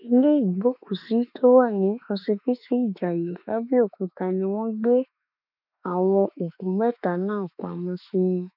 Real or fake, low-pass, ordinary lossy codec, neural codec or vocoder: fake; 5.4 kHz; none; autoencoder, 48 kHz, 32 numbers a frame, DAC-VAE, trained on Japanese speech